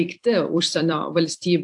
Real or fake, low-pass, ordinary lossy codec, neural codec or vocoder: fake; 10.8 kHz; AAC, 64 kbps; vocoder, 44.1 kHz, 128 mel bands every 256 samples, BigVGAN v2